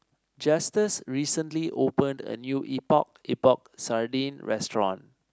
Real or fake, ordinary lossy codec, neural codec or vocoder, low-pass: real; none; none; none